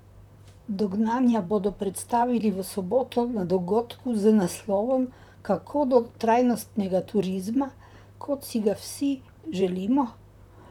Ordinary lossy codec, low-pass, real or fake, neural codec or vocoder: none; 19.8 kHz; fake; vocoder, 44.1 kHz, 128 mel bands, Pupu-Vocoder